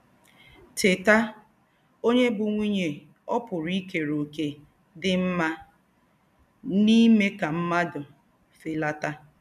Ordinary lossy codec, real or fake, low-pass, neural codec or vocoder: none; real; 14.4 kHz; none